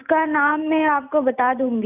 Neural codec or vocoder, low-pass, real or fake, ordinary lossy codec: none; 3.6 kHz; real; none